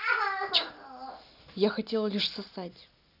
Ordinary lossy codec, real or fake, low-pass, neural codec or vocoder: none; real; 5.4 kHz; none